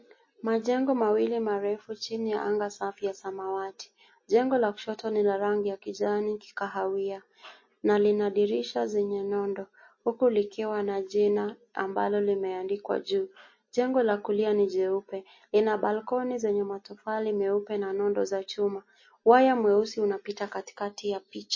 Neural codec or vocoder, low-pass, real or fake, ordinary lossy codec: none; 7.2 kHz; real; MP3, 32 kbps